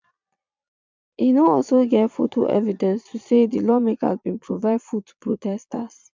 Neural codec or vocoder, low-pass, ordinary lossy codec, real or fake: vocoder, 22.05 kHz, 80 mel bands, Vocos; 7.2 kHz; MP3, 64 kbps; fake